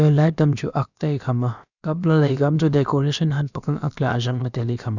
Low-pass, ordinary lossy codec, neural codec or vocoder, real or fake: 7.2 kHz; none; codec, 16 kHz, about 1 kbps, DyCAST, with the encoder's durations; fake